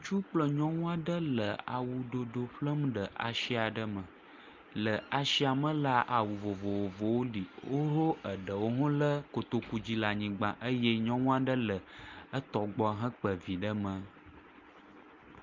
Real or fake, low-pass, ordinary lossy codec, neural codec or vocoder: real; 7.2 kHz; Opus, 32 kbps; none